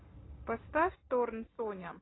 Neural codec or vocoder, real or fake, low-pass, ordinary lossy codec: none; real; 7.2 kHz; AAC, 16 kbps